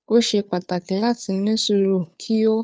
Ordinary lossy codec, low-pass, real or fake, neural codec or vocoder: none; none; fake; codec, 16 kHz, 2 kbps, FunCodec, trained on Chinese and English, 25 frames a second